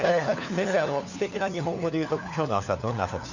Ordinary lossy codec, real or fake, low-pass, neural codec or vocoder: none; fake; 7.2 kHz; codec, 16 kHz, 4 kbps, FunCodec, trained on LibriTTS, 50 frames a second